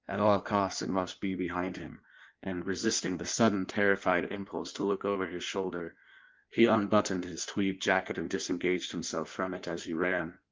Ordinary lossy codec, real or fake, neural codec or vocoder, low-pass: Opus, 32 kbps; fake; codec, 16 kHz in and 24 kHz out, 1.1 kbps, FireRedTTS-2 codec; 7.2 kHz